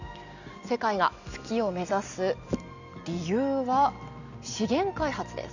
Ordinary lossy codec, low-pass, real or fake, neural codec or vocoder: none; 7.2 kHz; real; none